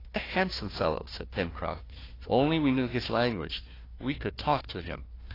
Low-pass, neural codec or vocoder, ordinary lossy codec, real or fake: 5.4 kHz; codec, 16 kHz, 1 kbps, FunCodec, trained on Chinese and English, 50 frames a second; AAC, 24 kbps; fake